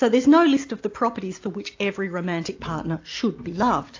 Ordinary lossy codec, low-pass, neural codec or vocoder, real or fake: AAC, 48 kbps; 7.2 kHz; none; real